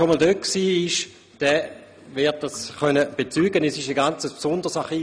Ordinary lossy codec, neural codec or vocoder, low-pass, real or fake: none; none; none; real